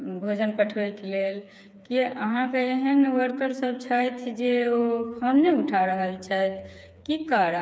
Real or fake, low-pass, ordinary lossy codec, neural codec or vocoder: fake; none; none; codec, 16 kHz, 4 kbps, FreqCodec, smaller model